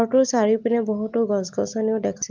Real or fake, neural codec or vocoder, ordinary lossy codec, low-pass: real; none; Opus, 32 kbps; 7.2 kHz